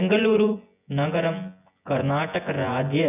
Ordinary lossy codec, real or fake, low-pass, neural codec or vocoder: none; fake; 3.6 kHz; vocoder, 24 kHz, 100 mel bands, Vocos